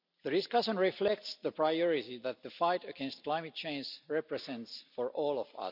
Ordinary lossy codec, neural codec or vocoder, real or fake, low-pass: none; none; real; 5.4 kHz